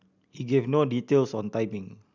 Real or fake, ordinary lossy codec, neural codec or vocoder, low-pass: real; none; none; 7.2 kHz